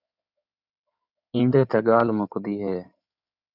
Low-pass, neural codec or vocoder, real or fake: 5.4 kHz; codec, 16 kHz in and 24 kHz out, 2.2 kbps, FireRedTTS-2 codec; fake